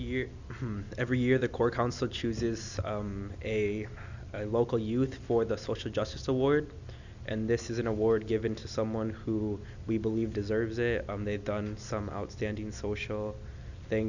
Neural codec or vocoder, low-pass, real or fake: none; 7.2 kHz; real